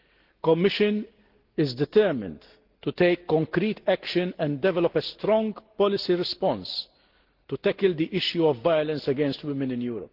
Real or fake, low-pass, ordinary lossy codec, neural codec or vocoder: real; 5.4 kHz; Opus, 16 kbps; none